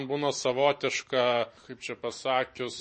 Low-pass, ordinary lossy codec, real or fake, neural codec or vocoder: 10.8 kHz; MP3, 32 kbps; fake; vocoder, 44.1 kHz, 128 mel bands every 512 samples, BigVGAN v2